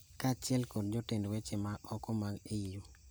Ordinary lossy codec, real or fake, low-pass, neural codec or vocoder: none; real; none; none